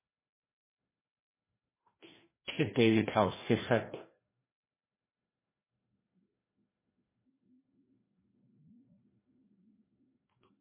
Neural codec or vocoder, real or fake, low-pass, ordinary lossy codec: codec, 16 kHz, 1 kbps, FreqCodec, larger model; fake; 3.6 kHz; MP3, 16 kbps